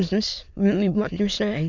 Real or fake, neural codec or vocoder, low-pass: fake; autoencoder, 22.05 kHz, a latent of 192 numbers a frame, VITS, trained on many speakers; 7.2 kHz